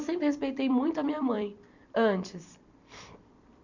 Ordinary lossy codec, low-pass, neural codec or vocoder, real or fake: none; 7.2 kHz; vocoder, 44.1 kHz, 128 mel bands every 256 samples, BigVGAN v2; fake